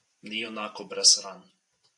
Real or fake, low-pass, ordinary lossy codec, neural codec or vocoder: fake; 10.8 kHz; AAC, 64 kbps; vocoder, 44.1 kHz, 128 mel bands every 512 samples, BigVGAN v2